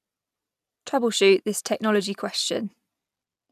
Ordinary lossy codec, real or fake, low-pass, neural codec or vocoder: none; real; 14.4 kHz; none